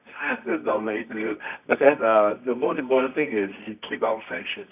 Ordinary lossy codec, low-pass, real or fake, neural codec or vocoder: none; 3.6 kHz; fake; codec, 24 kHz, 0.9 kbps, WavTokenizer, medium music audio release